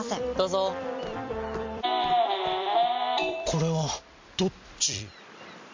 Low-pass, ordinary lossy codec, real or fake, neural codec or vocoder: 7.2 kHz; MP3, 64 kbps; fake; vocoder, 44.1 kHz, 128 mel bands every 256 samples, BigVGAN v2